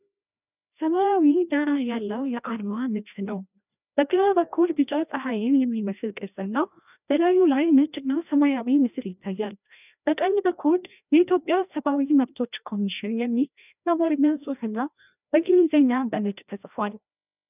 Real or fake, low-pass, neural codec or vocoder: fake; 3.6 kHz; codec, 16 kHz, 1 kbps, FreqCodec, larger model